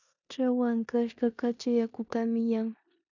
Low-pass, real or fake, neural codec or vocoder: 7.2 kHz; fake; codec, 16 kHz in and 24 kHz out, 0.9 kbps, LongCat-Audio-Codec, four codebook decoder